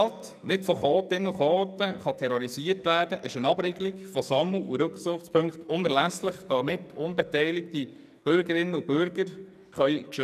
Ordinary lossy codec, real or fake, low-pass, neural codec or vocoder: none; fake; 14.4 kHz; codec, 44.1 kHz, 2.6 kbps, SNAC